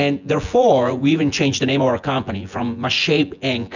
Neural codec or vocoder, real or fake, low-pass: vocoder, 24 kHz, 100 mel bands, Vocos; fake; 7.2 kHz